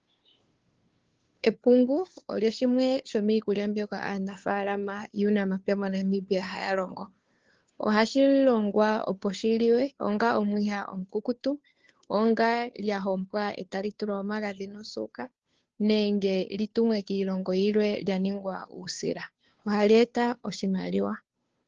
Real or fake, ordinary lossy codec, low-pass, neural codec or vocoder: fake; Opus, 16 kbps; 7.2 kHz; codec, 16 kHz, 2 kbps, FunCodec, trained on Chinese and English, 25 frames a second